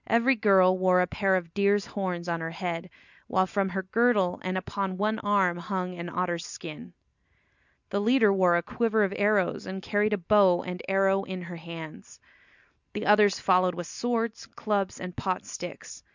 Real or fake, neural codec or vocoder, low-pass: real; none; 7.2 kHz